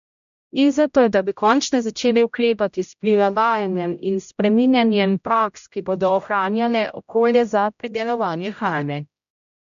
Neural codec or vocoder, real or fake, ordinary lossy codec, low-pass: codec, 16 kHz, 0.5 kbps, X-Codec, HuBERT features, trained on general audio; fake; MP3, 64 kbps; 7.2 kHz